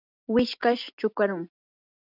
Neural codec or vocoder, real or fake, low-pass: none; real; 5.4 kHz